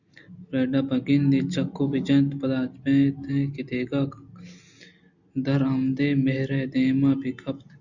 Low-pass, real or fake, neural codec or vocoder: 7.2 kHz; real; none